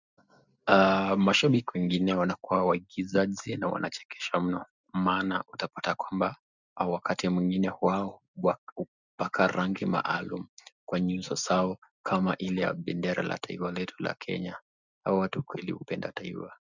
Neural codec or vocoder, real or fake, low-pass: none; real; 7.2 kHz